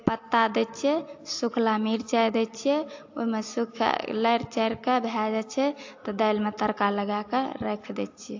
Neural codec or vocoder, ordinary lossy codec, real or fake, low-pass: none; AAC, 48 kbps; real; 7.2 kHz